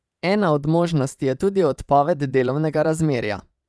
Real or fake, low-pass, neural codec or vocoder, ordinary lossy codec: fake; none; vocoder, 22.05 kHz, 80 mel bands, Vocos; none